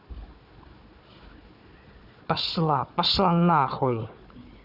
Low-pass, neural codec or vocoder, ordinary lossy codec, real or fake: 5.4 kHz; codec, 16 kHz, 4 kbps, FunCodec, trained on Chinese and English, 50 frames a second; none; fake